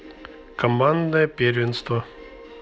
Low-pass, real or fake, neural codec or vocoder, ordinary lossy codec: none; real; none; none